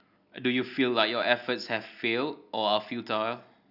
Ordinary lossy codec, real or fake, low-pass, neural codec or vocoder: none; real; 5.4 kHz; none